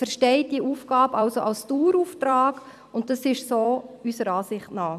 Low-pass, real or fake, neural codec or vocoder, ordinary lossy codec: 14.4 kHz; fake; vocoder, 44.1 kHz, 128 mel bands every 256 samples, BigVGAN v2; MP3, 96 kbps